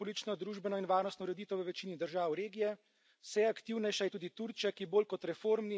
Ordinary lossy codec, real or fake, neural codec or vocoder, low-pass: none; real; none; none